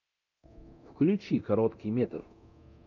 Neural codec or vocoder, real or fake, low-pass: codec, 24 kHz, 0.9 kbps, DualCodec; fake; 7.2 kHz